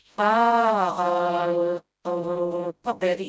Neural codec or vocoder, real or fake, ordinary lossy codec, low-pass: codec, 16 kHz, 0.5 kbps, FreqCodec, smaller model; fake; none; none